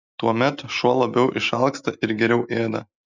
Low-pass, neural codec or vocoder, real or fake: 7.2 kHz; none; real